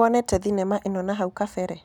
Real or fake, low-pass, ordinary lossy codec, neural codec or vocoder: real; 19.8 kHz; none; none